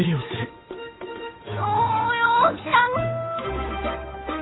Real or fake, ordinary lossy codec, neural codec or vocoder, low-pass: fake; AAC, 16 kbps; codec, 16 kHz, 8 kbps, FunCodec, trained on Chinese and English, 25 frames a second; 7.2 kHz